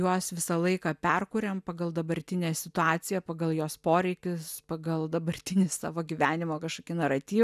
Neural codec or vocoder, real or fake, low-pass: none; real; 14.4 kHz